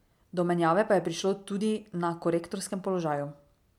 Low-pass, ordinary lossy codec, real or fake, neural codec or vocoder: 19.8 kHz; MP3, 96 kbps; real; none